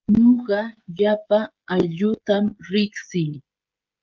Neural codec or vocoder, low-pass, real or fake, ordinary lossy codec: codec, 16 kHz, 16 kbps, FreqCodec, smaller model; 7.2 kHz; fake; Opus, 32 kbps